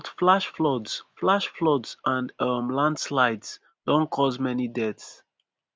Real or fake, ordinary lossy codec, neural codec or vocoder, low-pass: real; none; none; none